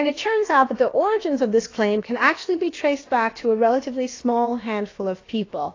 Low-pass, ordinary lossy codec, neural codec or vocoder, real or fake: 7.2 kHz; AAC, 32 kbps; codec, 16 kHz, about 1 kbps, DyCAST, with the encoder's durations; fake